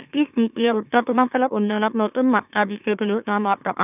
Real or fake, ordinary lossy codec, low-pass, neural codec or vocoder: fake; none; 3.6 kHz; autoencoder, 44.1 kHz, a latent of 192 numbers a frame, MeloTTS